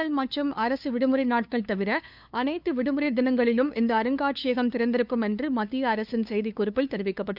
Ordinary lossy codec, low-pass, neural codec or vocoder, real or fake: none; 5.4 kHz; codec, 16 kHz, 2 kbps, FunCodec, trained on LibriTTS, 25 frames a second; fake